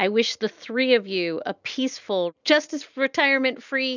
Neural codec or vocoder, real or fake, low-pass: none; real; 7.2 kHz